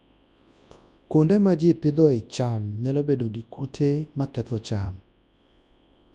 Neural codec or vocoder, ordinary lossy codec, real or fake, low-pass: codec, 24 kHz, 0.9 kbps, WavTokenizer, large speech release; none; fake; 10.8 kHz